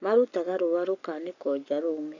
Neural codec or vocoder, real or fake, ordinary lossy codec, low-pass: codec, 44.1 kHz, 7.8 kbps, Pupu-Codec; fake; none; 7.2 kHz